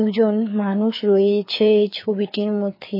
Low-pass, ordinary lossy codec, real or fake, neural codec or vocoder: 5.4 kHz; MP3, 24 kbps; fake; codec, 16 kHz, 4 kbps, FreqCodec, larger model